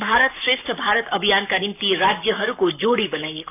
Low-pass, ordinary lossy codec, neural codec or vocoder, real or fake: 3.6 kHz; none; codec, 44.1 kHz, 7.8 kbps, Pupu-Codec; fake